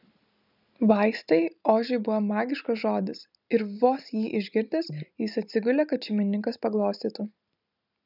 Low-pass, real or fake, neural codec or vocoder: 5.4 kHz; real; none